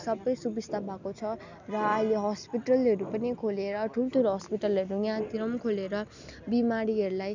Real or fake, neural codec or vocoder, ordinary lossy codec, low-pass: real; none; none; 7.2 kHz